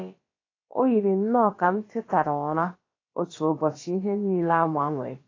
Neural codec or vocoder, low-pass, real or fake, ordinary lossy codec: codec, 16 kHz, about 1 kbps, DyCAST, with the encoder's durations; 7.2 kHz; fake; AAC, 32 kbps